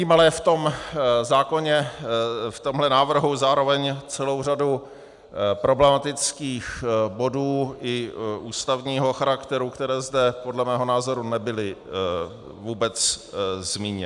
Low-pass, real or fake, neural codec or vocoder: 10.8 kHz; real; none